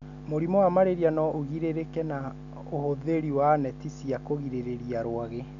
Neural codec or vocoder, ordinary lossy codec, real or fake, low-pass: none; none; real; 7.2 kHz